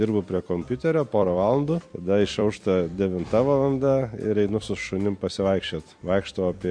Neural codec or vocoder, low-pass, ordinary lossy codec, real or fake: vocoder, 44.1 kHz, 128 mel bands every 256 samples, BigVGAN v2; 9.9 kHz; MP3, 48 kbps; fake